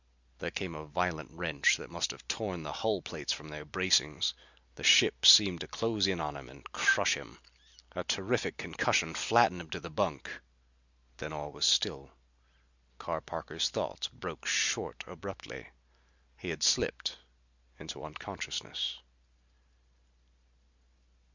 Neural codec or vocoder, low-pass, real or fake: none; 7.2 kHz; real